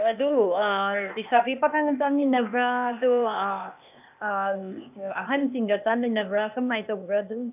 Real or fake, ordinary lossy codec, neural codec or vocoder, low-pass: fake; none; codec, 16 kHz, 0.8 kbps, ZipCodec; 3.6 kHz